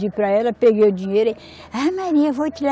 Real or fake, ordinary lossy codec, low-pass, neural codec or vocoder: real; none; none; none